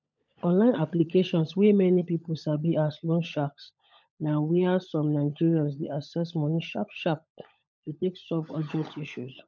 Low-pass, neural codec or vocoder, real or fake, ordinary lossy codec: 7.2 kHz; codec, 16 kHz, 16 kbps, FunCodec, trained on LibriTTS, 50 frames a second; fake; none